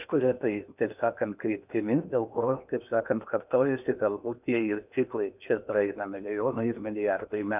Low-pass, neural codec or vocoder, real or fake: 3.6 kHz; codec, 16 kHz in and 24 kHz out, 0.8 kbps, FocalCodec, streaming, 65536 codes; fake